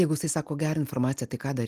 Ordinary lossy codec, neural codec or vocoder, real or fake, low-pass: Opus, 24 kbps; none; real; 14.4 kHz